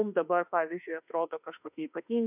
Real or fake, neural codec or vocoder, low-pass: fake; autoencoder, 48 kHz, 32 numbers a frame, DAC-VAE, trained on Japanese speech; 3.6 kHz